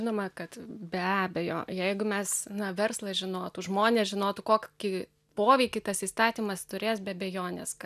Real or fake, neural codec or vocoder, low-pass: real; none; 14.4 kHz